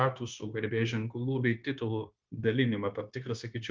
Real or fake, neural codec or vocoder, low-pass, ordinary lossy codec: fake; codec, 16 kHz, 0.9 kbps, LongCat-Audio-Codec; 7.2 kHz; Opus, 32 kbps